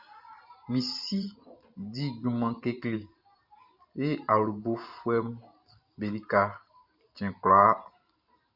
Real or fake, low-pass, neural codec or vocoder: fake; 5.4 kHz; vocoder, 44.1 kHz, 128 mel bands every 512 samples, BigVGAN v2